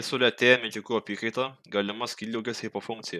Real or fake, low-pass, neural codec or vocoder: real; 14.4 kHz; none